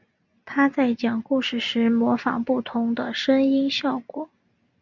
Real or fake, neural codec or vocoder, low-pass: real; none; 7.2 kHz